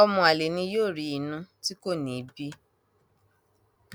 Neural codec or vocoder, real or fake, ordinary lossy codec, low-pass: none; real; none; none